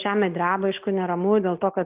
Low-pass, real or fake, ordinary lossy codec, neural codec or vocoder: 3.6 kHz; real; Opus, 32 kbps; none